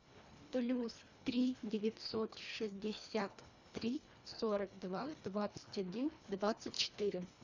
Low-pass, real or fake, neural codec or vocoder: 7.2 kHz; fake; codec, 24 kHz, 1.5 kbps, HILCodec